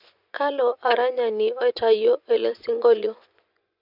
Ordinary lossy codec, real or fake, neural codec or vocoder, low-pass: none; fake; vocoder, 24 kHz, 100 mel bands, Vocos; 5.4 kHz